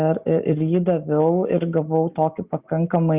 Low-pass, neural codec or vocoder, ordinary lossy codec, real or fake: 3.6 kHz; none; Opus, 64 kbps; real